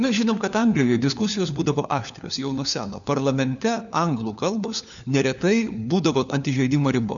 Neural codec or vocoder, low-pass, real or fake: codec, 16 kHz, 4 kbps, FunCodec, trained on LibriTTS, 50 frames a second; 7.2 kHz; fake